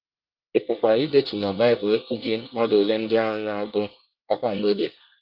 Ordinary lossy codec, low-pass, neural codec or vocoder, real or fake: Opus, 24 kbps; 5.4 kHz; codec, 24 kHz, 1 kbps, SNAC; fake